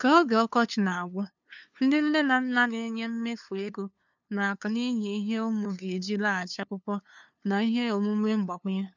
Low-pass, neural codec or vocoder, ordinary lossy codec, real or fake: 7.2 kHz; codec, 16 kHz, 2 kbps, FunCodec, trained on LibriTTS, 25 frames a second; none; fake